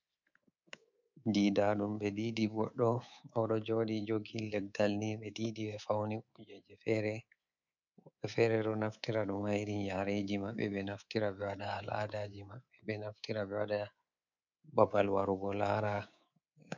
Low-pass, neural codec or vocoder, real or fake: 7.2 kHz; codec, 24 kHz, 3.1 kbps, DualCodec; fake